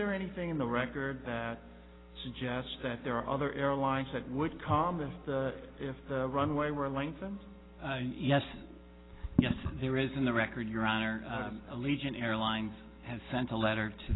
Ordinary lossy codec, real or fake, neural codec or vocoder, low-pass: AAC, 16 kbps; real; none; 7.2 kHz